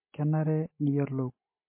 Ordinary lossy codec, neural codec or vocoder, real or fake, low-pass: MP3, 24 kbps; none; real; 3.6 kHz